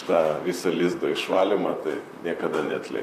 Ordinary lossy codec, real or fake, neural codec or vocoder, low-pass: AAC, 96 kbps; fake; vocoder, 44.1 kHz, 128 mel bands, Pupu-Vocoder; 14.4 kHz